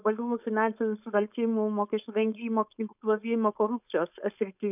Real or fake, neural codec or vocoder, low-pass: fake; codec, 16 kHz, 4.8 kbps, FACodec; 3.6 kHz